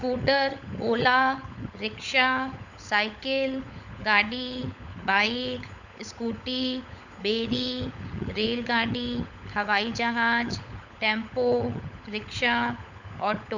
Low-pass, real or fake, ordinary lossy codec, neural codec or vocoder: 7.2 kHz; fake; none; codec, 16 kHz, 16 kbps, FunCodec, trained on LibriTTS, 50 frames a second